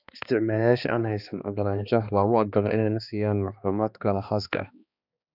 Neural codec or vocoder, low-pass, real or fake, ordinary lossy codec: codec, 16 kHz, 2 kbps, X-Codec, HuBERT features, trained on balanced general audio; 5.4 kHz; fake; none